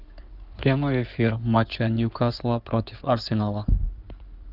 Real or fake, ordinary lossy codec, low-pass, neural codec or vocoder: fake; Opus, 24 kbps; 5.4 kHz; codec, 16 kHz in and 24 kHz out, 2.2 kbps, FireRedTTS-2 codec